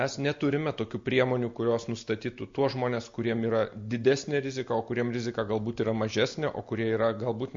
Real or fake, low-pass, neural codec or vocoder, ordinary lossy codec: real; 7.2 kHz; none; MP3, 48 kbps